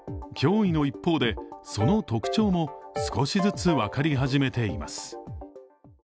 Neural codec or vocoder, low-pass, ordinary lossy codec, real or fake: none; none; none; real